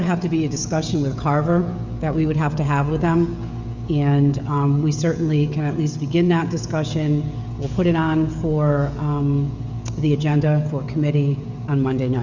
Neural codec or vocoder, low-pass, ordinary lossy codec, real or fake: codec, 16 kHz, 16 kbps, FreqCodec, smaller model; 7.2 kHz; Opus, 64 kbps; fake